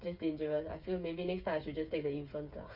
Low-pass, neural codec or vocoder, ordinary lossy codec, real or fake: 5.4 kHz; codec, 16 kHz, 8 kbps, FreqCodec, smaller model; none; fake